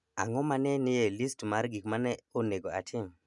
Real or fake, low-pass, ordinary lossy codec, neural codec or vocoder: real; 10.8 kHz; none; none